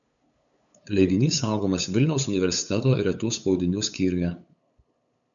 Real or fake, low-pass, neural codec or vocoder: fake; 7.2 kHz; codec, 16 kHz, 8 kbps, FunCodec, trained on LibriTTS, 25 frames a second